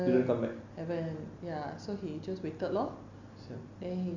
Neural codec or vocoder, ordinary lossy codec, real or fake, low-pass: none; none; real; 7.2 kHz